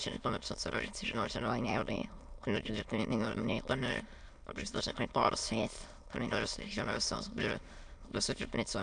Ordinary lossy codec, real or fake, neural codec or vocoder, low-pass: MP3, 96 kbps; fake; autoencoder, 22.05 kHz, a latent of 192 numbers a frame, VITS, trained on many speakers; 9.9 kHz